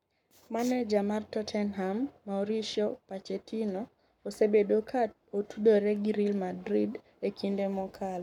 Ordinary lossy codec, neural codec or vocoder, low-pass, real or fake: none; codec, 44.1 kHz, 7.8 kbps, Pupu-Codec; 19.8 kHz; fake